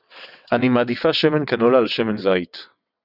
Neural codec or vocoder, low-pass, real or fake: vocoder, 22.05 kHz, 80 mel bands, WaveNeXt; 5.4 kHz; fake